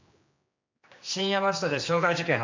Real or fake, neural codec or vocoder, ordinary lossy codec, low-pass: fake; codec, 16 kHz, 2 kbps, X-Codec, HuBERT features, trained on general audio; MP3, 64 kbps; 7.2 kHz